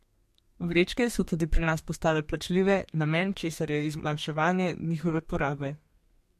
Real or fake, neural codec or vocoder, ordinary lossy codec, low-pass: fake; codec, 32 kHz, 1.9 kbps, SNAC; MP3, 64 kbps; 14.4 kHz